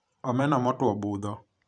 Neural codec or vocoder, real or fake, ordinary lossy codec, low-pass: vocoder, 44.1 kHz, 128 mel bands every 512 samples, BigVGAN v2; fake; none; 9.9 kHz